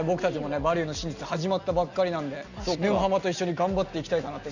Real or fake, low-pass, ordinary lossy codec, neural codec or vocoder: real; 7.2 kHz; none; none